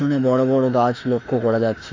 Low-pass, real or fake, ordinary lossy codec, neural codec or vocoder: 7.2 kHz; fake; AAC, 32 kbps; autoencoder, 48 kHz, 32 numbers a frame, DAC-VAE, trained on Japanese speech